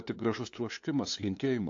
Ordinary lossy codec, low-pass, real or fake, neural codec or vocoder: AAC, 48 kbps; 7.2 kHz; fake; codec, 16 kHz, 2 kbps, FunCodec, trained on LibriTTS, 25 frames a second